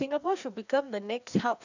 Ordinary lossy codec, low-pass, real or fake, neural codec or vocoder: none; 7.2 kHz; fake; codec, 16 kHz, 2 kbps, FreqCodec, larger model